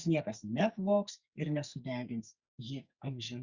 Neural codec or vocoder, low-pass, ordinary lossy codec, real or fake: codec, 44.1 kHz, 2.6 kbps, SNAC; 7.2 kHz; Opus, 64 kbps; fake